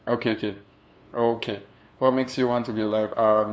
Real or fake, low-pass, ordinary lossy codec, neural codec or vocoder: fake; none; none; codec, 16 kHz, 2 kbps, FunCodec, trained on LibriTTS, 25 frames a second